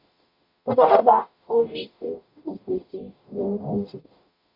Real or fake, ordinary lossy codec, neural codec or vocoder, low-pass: fake; AAC, 48 kbps; codec, 44.1 kHz, 0.9 kbps, DAC; 5.4 kHz